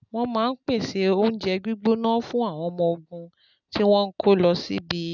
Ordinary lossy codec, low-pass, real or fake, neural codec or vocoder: none; 7.2 kHz; real; none